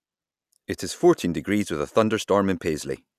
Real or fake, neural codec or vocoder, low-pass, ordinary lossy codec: real; none; 14.4 kHz; none